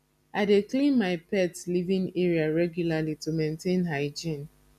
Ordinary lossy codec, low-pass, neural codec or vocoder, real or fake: none; 14.4 kHz; none; real